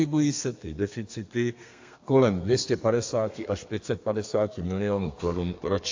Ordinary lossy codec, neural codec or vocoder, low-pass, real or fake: AAC, 48 kbps; codec, 32 kHz, 1.9 kbps, SNAC; 7.2 kHz; fake